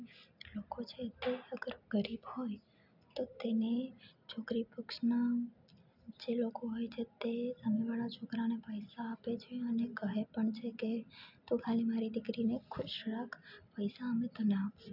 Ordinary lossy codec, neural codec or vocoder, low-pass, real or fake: none; none; 5.4 kHz; real